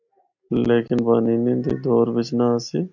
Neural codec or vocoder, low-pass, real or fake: none; 7.2 kHz; real